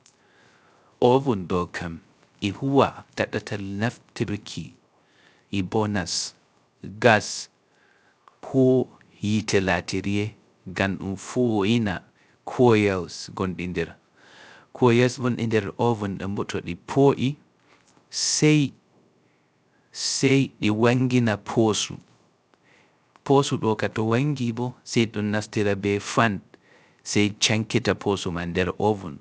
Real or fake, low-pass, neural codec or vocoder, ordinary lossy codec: fake; none; codec, 16 kHz, 0.3 kbps, FocalCodec; none